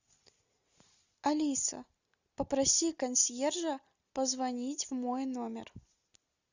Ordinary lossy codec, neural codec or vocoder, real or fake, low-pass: Opus, 64 kbps; none; real; 7.2 kHz